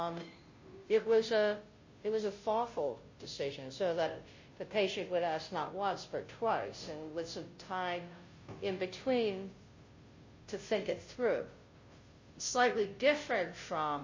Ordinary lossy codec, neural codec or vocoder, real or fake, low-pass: MP3, 32 kbps; codec, 16 kHz, 0.5 kbps, FunCodec, trained on Chinese and English, 25 frames a second; fake; 7.2 kHz